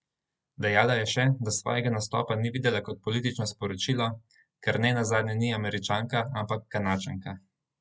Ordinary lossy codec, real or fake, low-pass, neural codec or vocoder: none; real; none; none